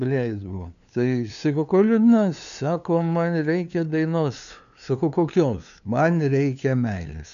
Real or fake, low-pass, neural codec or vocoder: fake; 7.2 kHz; codec, 16 kHz, 2 kbps, FunCodec, trained on LibriTTS, 25 frames a second